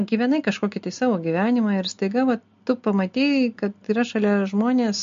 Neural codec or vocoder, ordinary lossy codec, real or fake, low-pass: none; MP3, 48 kbps; real; 7.2 kHz